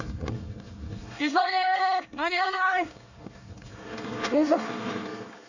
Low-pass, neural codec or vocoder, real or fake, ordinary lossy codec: 7.2 kHz; codec, 24 kHz, 1 kbps, SNAC; fake; none